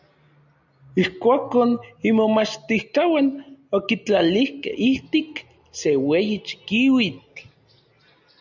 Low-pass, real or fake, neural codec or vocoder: 7.2 kHz; real; none